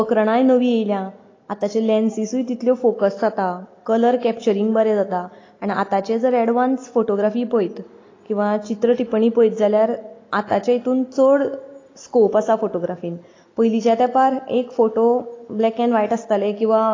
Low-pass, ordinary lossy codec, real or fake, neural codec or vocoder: 7.2 kHz; AAC, 32 kbps; real; none